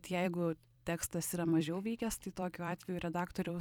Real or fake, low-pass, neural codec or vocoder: fake; 19.8 kHz; vocoder, 44.1 kHz, 128 mel bands every 256 samples, BigVGAN v2